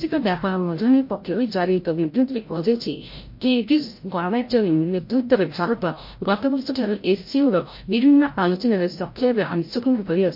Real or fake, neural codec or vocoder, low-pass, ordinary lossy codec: fake; codec, 16 kHz, 0.5 kbps, FreqCodec, larger model; 5.4 kHz; MP3, 32 kbps